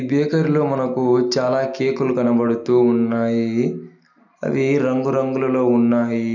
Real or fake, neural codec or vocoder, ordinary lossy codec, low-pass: real; none; none; 7.2 kHz